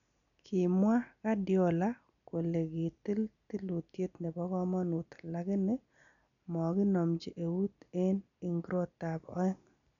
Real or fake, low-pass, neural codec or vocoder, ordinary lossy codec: real; 7.2 kHz; none; none